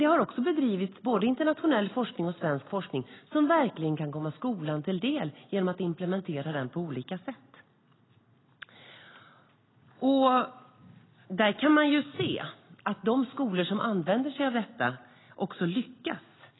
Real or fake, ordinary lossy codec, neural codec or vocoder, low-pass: real; AAC, 16 kbps; none; 7.2 kHz